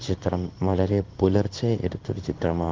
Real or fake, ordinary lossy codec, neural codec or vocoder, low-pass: fake; Opus, 16 kbps; codec, 16 kHz in and 24 kHz out, 1 kbps, XY-Tokenizer; 7.2 kHz